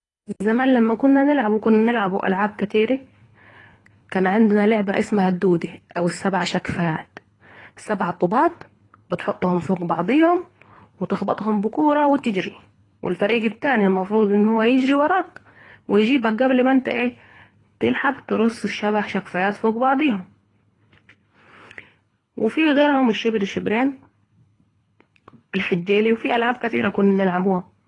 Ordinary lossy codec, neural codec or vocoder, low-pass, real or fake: AAC, 32 kbps; codec, 24 kHz, 3 kbps, HILCodec; 10.8 kHz; fake